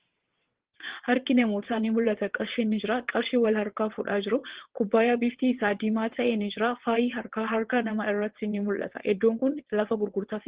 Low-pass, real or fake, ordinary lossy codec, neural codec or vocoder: 3.6 kHz; fake; Opus, 16 kbps; vocoder, 22.05 kHz, 80 mel bands, WaveNeXt